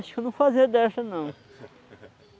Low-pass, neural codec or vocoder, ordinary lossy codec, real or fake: none; none; none; real